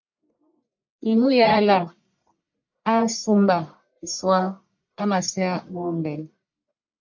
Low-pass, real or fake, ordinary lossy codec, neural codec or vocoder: 7.2 kHz; fake; MP3, 48 kbps; codec, 44.1 kHz, 1.7 kbps, Pupu-Codec